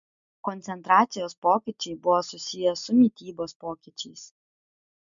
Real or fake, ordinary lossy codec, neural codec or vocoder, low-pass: real; MP3, 64 kbps; none; 7.2 kHz